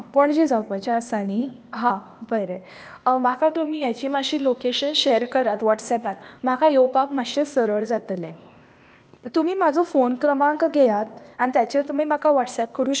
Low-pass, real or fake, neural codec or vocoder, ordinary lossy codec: none; fake; codec, 16 kHz, 0.8 kbps, ZipCodec; none